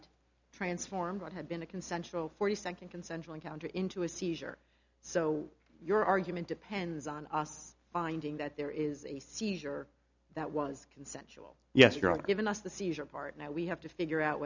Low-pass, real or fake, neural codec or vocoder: 7.2 kHz; real; none